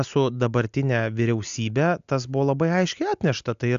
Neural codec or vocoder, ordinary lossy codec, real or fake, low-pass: none; AAC, 96 kbps; real; 7.2 kHz